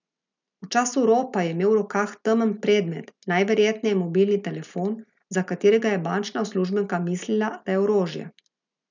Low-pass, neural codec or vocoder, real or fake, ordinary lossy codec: 7.2 kHz; none; real; none